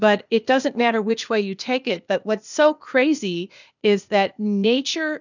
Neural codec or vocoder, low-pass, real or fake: codec, 16 kHz, 0.8 kbps, ZipCodec; 7.2 kHz; fake